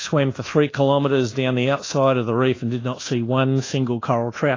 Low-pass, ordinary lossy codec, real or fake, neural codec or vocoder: 7.2 kHz; AAC, 32 kbps; fake; codec, 24 kHz, 1.2 kbps, DualCodec